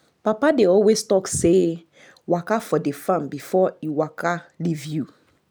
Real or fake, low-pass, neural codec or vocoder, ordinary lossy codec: real; none; none; none